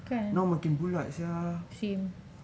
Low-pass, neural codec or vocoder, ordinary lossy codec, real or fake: none; none; none; real